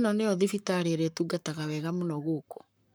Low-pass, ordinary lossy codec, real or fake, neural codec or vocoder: none; none; fake; codec, 44.1 kHz, 7.8 kbps, Pupu-Codec